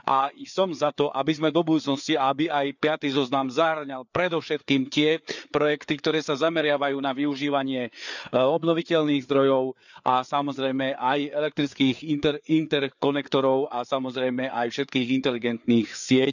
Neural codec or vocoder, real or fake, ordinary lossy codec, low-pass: codec, 16 kHz, 4 kbps, FreqCodec, larger model; fake; none; 7.2 kHz